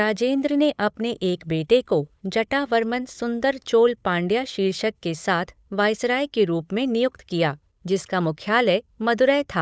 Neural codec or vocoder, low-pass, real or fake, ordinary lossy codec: codec, 16 kHz, 8 kbps, FunCodec, trained on Chinese and English, 25 frames a second; none; fake; none